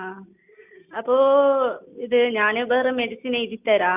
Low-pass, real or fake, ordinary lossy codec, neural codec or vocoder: 3.6 kHz; real; none; none